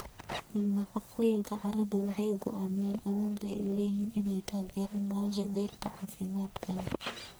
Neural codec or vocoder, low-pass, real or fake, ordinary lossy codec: codec, 44.1 kHz, 1.7 kbps, Pupu-Codec; none; fake; none